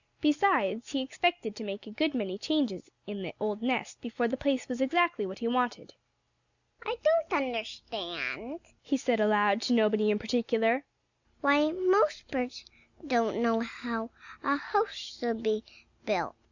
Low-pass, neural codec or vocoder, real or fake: 7.2 kHz; none; real